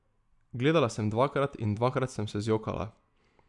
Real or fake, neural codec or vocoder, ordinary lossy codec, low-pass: real; none; none; 10.8 kHz